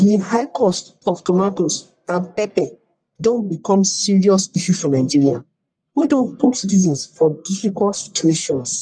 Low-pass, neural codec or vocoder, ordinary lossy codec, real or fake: 9.9 kHz; codec, 44.1 kHz, 1.7 kbps, Pupu-Codec; none; fake